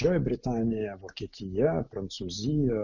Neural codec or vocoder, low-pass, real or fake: none; 7.2 kHz; real